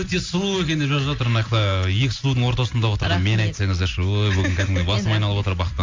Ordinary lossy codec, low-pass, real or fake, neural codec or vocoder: MP3, 48 kbps; 7.2 kHz; real; none